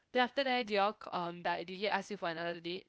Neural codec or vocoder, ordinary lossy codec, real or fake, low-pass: codec, 16 kHz, 0.8 kbps, ZipCodec; none; fake; none